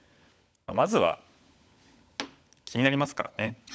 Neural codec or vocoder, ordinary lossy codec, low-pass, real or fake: codec, 16 kHz, 16 kbps, FunCodec, trained on LibriTTS, 50 frames a second; none; none; fake